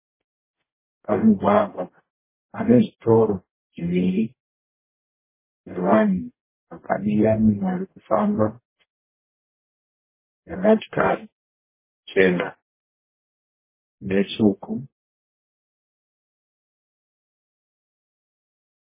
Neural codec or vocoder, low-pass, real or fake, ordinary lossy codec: codec, 44.1 kHz, 0.9 kbps, DAC; 3.6 kHz; fake; MP3, 16 kbps